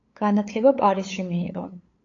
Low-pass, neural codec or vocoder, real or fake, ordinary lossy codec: 7.2 kHz; codec, 16 kHz, 8 kbps, FunCodec, trained on LibriTTS, 25 frames a second; fake; AAC, 32 kbps